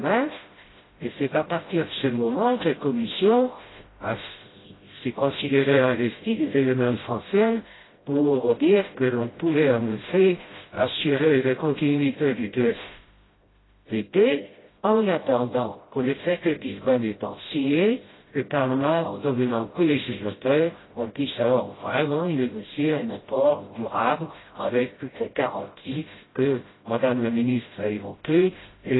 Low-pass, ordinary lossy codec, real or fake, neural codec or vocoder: 7.2 kHz; AAC, 16 kbps; fake; codec, 16 kHz, 0.5 kbps, FreqCodec, smaller model